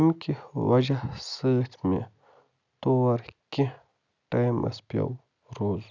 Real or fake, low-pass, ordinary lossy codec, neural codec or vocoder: real; 7.2 kHz; none; none